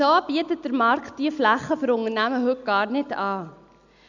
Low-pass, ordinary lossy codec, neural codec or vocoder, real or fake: 7.2 kHz; none; none; real